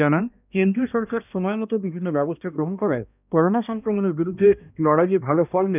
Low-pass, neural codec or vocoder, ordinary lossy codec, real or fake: 3.6 kHz; codec, 16 kHz, 1 kbps, X-Codec, HuBERT features, trained on balanced general audio; none; fake